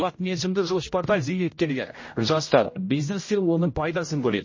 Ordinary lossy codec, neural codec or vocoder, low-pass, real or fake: MP3, 32 kbps; codec, 16 kHz, 0.5 kbps, X-Codec, HuBERT features, trained on general audio; 7.2 kHz; fake